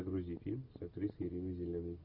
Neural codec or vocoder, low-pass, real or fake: none; 5.4 kHz; real